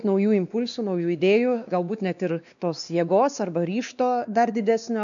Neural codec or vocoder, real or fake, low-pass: codec, 16 kHz, 2 kbps, X-Codec, WavLM features, trained on Multilingual LibriSpeech; fake; 7.2 kHz